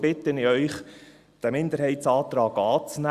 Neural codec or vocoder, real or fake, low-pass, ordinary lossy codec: none; real; 14.4 kHz; Opus, 64 kbps